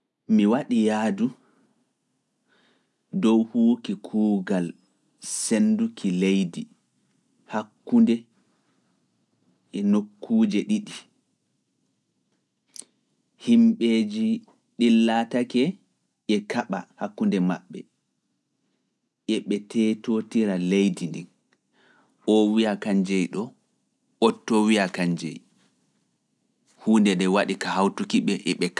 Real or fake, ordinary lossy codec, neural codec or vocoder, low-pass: real; none; none; none